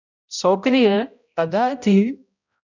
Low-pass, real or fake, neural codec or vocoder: 7.2 kHz; fake; codec, 16 kHz, 0.5 kbps, X-Codec, HuBERT features, trained on balanced general audio